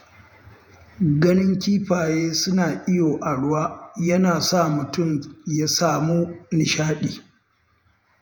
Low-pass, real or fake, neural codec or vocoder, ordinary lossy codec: none; fake; vocoder, 48 kHz, 128 mel bands, Vocos; none